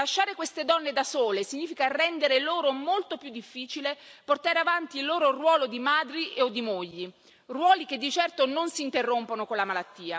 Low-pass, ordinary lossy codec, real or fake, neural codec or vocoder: none; none; real; none